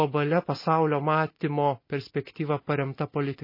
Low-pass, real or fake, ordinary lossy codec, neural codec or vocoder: 5.4 kHz; real; MP3, 24 kbps; none